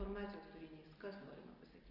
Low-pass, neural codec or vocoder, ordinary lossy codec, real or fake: 5.4 kHz; none; Opus, 32 kbps; real